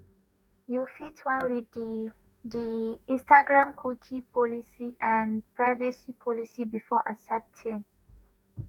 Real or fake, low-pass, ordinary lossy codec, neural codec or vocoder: fake; 19.8 kHz; none; codec, 44.1 kHz, 2.6 kbps, DAC